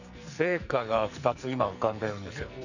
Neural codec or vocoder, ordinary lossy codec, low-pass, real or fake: codec, 44.1 kHz, 2.6 kbps, SNAC; none; 7.2 kHz; fake